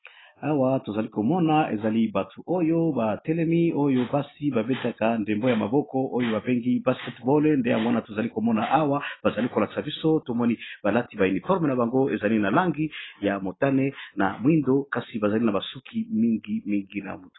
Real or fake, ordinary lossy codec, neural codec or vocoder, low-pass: real; AAC, 16 kbps; none; 7.2 kHz